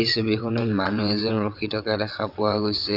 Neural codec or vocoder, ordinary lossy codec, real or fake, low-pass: vocoder, 22.05 kHz, 80 mel bands, WaveNeXt; none; fake; 5.4 kHz